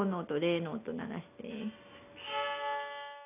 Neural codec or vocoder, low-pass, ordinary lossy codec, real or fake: none; 3.6 kHz; none; real